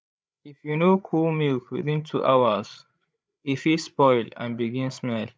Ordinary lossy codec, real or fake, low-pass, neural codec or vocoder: none; fake; none; codec, 16 kHz, 8 kbps, FreqCodec, larger model